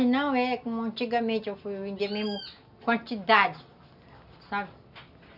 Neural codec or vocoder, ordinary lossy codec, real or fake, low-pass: none; none; real; 5.4 kHz